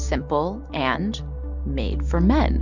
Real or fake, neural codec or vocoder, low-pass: real; none; 7.2 kHz